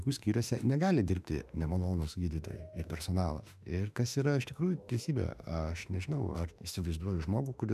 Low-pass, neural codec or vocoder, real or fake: 14.4 kHz; autoencoder, 48 kHz, 32 numbers a frame, DAC-VAE, trained on Japanese speech; fake